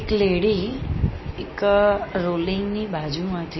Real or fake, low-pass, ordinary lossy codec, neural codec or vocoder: real; 7.2 kHz; MP3, 24 kbps; none